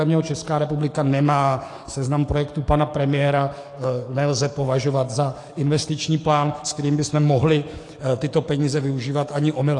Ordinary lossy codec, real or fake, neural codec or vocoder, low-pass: AAC, 48 kbps; fake; codec, 44.1 kHz, 7.8 kbps, DAC; 10.8 kHz